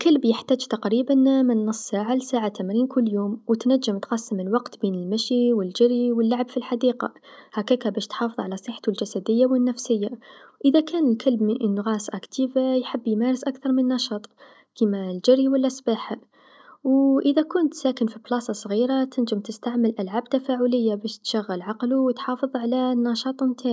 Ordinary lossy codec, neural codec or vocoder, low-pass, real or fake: none; none; none; real